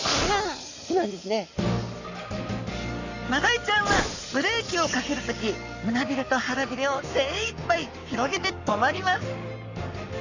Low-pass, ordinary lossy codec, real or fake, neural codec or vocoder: 7.2 kHz; none; fake; codec, 44.1 kHz, 7.8 kbps, Pupu-Codec